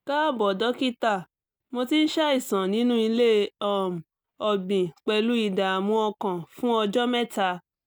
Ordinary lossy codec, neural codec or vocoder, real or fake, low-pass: none; none; real; none